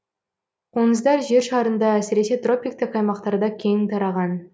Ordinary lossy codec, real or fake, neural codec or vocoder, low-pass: none; real; none; none